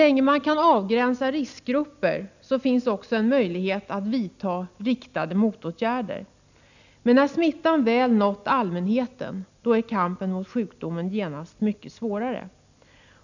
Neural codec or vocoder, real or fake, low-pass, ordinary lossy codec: none; real; 7.2 kHz; none